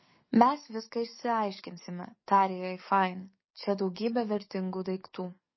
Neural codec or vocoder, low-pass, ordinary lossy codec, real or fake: codec, 44.1 kHz, 7.8 kbps, DAC; 7.2 kHz; MP3, 24 kbps; fake